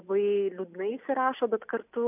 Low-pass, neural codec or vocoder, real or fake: 3.6 kHz; none; real